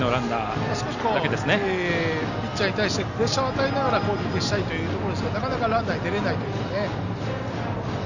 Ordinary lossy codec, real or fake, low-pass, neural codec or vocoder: none; real; 7.2 kHz; none